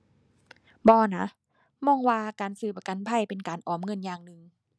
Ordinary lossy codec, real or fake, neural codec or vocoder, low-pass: none; real; none; none